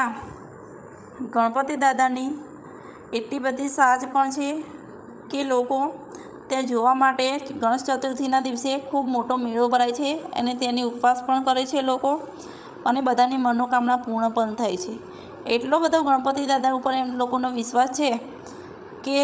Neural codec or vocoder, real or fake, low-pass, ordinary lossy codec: codec, 16 kHz, 8 kbps, FreqCodec, larger model; fake; none; none